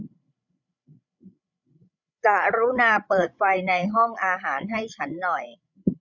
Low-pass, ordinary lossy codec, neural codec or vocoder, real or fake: 7.2 kHz; none; codec, 16 kHz, 16 kbps, FreqCodec, larger model; fake